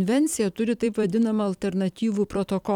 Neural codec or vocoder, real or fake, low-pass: vocoder, 44.1 kHz, 128 mel bands every 256 samples, BigVGAN v2; fake; 19.8 kHz